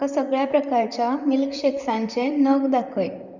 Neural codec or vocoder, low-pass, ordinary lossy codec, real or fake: vocoder, 44.1 kHz, 128 mel bands, Pupu-Vocoder; 7.2 kHz; Opus, 64 kbps; fake